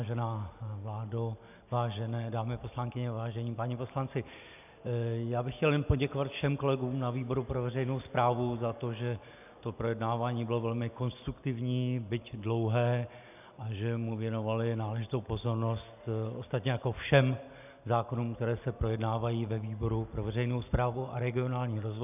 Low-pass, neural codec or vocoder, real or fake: 3.6 kHz; none; real